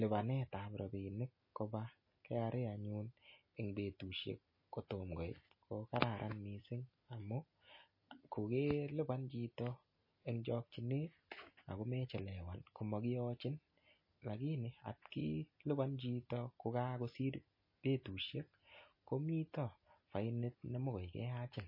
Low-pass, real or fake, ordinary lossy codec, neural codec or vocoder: 5.4 kHz; real; MP3, 24 kbps; none